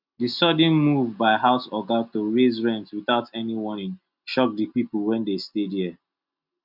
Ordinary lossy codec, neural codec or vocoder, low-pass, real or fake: none; none; 5.4 kHz; real